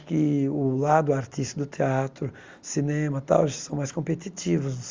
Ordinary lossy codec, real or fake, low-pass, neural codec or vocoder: Opus, 32 kbps; real; 7.2 kHz; none